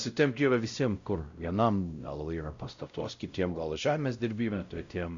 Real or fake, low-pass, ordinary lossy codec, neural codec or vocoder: fake; 7.2 kHz; Opus, 64 kbps; codec, 16 kHz, 0.5 kbps, X-Codec, WavLM features, trained on Multilingual LibriSpeech